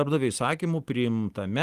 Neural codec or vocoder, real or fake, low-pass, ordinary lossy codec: vocoder, 44.1 kHz, 128 mel bands every 256 samples, BigVGAN v2; fake; 14.4 kHz; Opus, 24 kbps